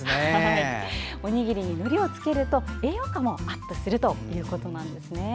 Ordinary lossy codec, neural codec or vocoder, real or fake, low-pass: none; none; real; none